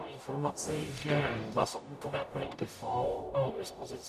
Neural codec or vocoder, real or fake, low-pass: codec, 44.1 kHz, 0.9 kbps, DAC; fake; 14.4 kHz